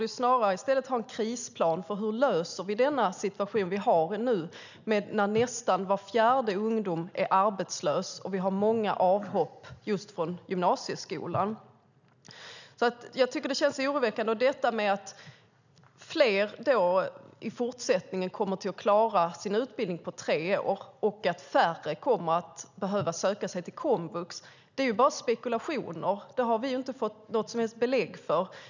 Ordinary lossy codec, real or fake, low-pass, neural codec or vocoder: none; real; 7.2 kHz; none